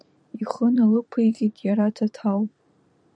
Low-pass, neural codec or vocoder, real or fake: 9.9 kHz; vocoder, 44.1 kHz, 128 mel bands every 512 samples, BigVGAN v2; fake